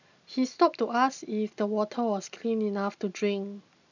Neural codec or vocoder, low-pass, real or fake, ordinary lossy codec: none; 7.2 kHz; real; none